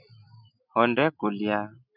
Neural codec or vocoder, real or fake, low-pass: none; real; 5.4 kHz